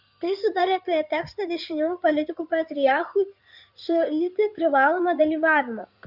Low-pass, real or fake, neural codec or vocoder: 5.4 kHz; fake; codec, 16 kHz in and 24 kHz out, 2.2 kbps, FireRedTTS-2 codec